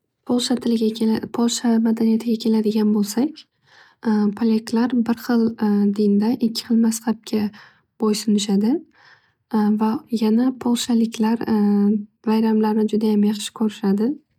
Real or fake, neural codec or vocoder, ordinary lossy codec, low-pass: real; none; none; 19.8 kHz